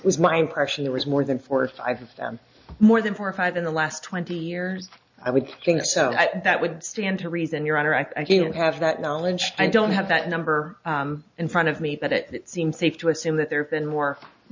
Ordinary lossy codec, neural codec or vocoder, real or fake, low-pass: MP3, 64 kbps; none; real; 7.2 kHz